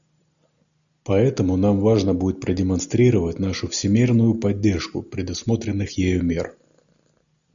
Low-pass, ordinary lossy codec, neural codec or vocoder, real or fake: 7.2 kHz; MP3, 96 kbps; none; real